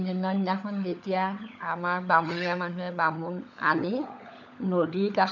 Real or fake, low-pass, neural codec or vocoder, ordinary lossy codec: fake; 7.2 kHz; codec, 16 kHz, 16 kbps, FunCodec, trained on LibriTTS, 50 frames a second; none